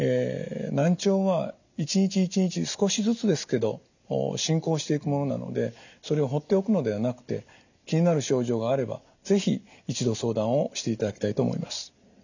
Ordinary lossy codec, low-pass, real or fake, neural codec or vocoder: none; 7.2 kHz; real; none